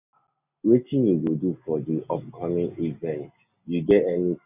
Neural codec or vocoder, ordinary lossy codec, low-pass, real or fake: none; none; 3.6 kHz; real